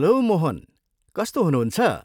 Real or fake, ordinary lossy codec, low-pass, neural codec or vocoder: real; none; 19.8 kHz; none